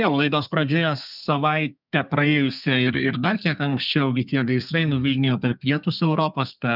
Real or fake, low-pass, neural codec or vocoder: fake; 5.4 kHz; codec, 32 kHz, 1.9 kbps, SNAC